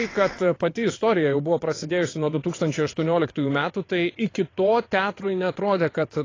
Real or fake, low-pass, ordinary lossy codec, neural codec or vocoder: fake; 7.2 kHz; AAC, 32 kbps; vocoder, 22.05 kHz, 80 mel bands, WaveNeXt